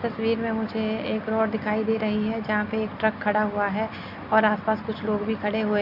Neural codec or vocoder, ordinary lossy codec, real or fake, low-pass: none; none; real; 5.4 kHz